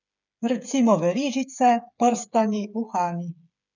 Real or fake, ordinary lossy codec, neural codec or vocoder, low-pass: fake; none; codec, 16 kHz, 8 kbps, FreqCodec, smaller model; 7.2 kHz